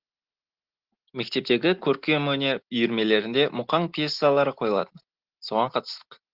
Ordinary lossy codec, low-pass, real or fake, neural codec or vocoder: Opus, 16 kbps; 5.4 kHz; real; none